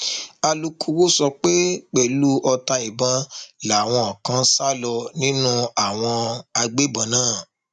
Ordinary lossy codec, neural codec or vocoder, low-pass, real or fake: none; none; 10.8 kHz; real